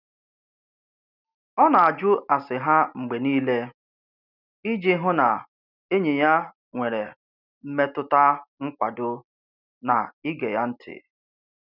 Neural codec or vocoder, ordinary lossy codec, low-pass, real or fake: none; none; 5.4 kHz; real